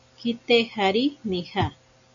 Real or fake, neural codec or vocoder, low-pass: real; none; 7.2 kHz